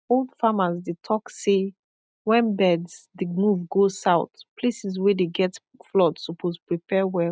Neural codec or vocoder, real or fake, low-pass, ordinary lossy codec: none; real; none; none